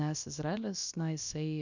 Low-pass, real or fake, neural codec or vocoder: 7.2 kHz; fake; codec, 16 kHz, about 1 kbps, DyCAST, with the encoder's durations